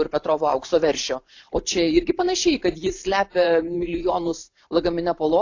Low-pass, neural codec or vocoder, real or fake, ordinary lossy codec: 7.2 kHz; vocoder, 44.1 kHz, 128 mel bands every 512 samples, BigVGAN v2; fake; AAC, 48 kbps